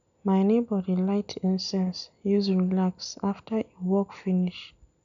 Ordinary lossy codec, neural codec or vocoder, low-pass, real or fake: none; none; 7.2 kHz; real